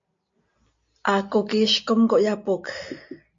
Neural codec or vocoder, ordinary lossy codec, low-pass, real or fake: none; MP3, 48 kbps; 7.2 kHz; real